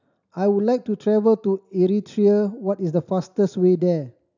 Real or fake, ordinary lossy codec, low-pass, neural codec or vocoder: real; none; 7.2 kHz; none